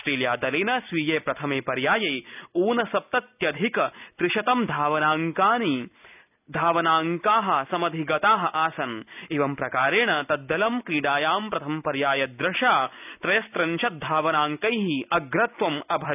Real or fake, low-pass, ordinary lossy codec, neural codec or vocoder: real; 3.6 kHz; none; none